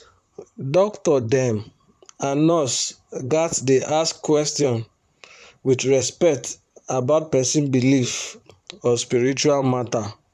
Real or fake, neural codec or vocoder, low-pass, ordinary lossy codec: fake; vocoder, 44.1 kHz, 128 mel bands, Pupu-Vocoder; 14.4 kHz; none